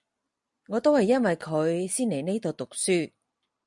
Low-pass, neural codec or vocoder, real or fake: 10.8 kHz; none; real